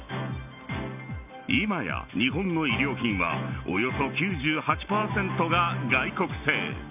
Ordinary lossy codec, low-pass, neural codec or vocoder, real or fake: none; 3.6 kHz; none; real